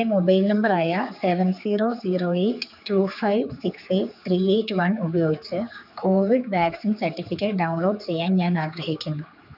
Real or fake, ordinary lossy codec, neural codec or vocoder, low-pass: fake; none; codec, 16 kHz, 4 kbps, X-Codec, HuBERT features, trained on general audio; 5.4 kHz